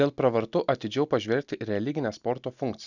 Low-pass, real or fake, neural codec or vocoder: 7.2 kHz; real; none